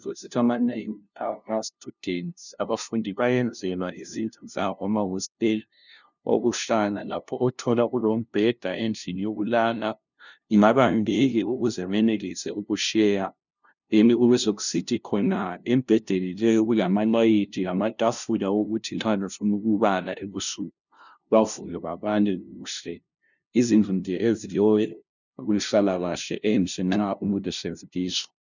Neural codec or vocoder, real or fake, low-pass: codec, 16 kHz, 0.5 kbps, FunCodec, trained on LibriTTS, 25 frames a second; fake; 7.2 kHz